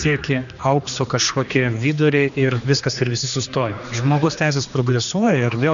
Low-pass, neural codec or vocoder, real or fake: 7.2 kHz; codec, 16 kHz, 2 kbps, X-Codec, HuBERT features, trained on general audio; fake